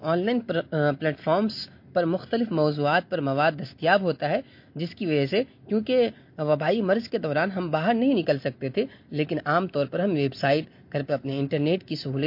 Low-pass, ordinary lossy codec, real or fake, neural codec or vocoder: 5.4 kHz; MP3, 32 kbps; real; none